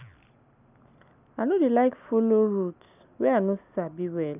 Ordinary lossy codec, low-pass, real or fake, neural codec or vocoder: none; 3.6 kHz; real; none